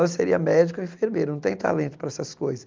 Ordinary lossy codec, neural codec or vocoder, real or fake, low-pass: Opus, 32 kbps; none; real; 7.2 kHz